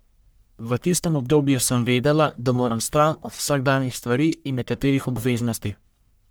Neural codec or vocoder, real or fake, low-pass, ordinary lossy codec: codec, 44.1 kHz, 1.7 kbps, Pupu-Codec; fake; none; none